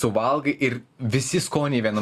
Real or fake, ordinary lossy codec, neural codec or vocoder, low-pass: real; Opus, 64 kbps; none; 14.4 kHz